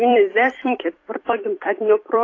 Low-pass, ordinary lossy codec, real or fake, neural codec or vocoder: 7.2 kHz; AAC, 32 kbps; fake; vocoder, 44.1 kHz, 128 mel bands every 256 samples, BigVGAN v2